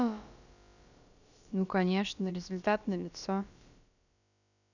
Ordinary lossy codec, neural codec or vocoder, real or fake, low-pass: none; codec, 16 kHz, about 1 kbps, DyCAST, with the encoder's durations; fake; 7.2 kHz